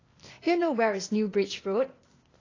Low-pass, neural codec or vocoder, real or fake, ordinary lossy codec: 7.2 kHz; codec, 16 kHz, 0.8 kbps, ZipCodec; fake; AAC, 32 kbps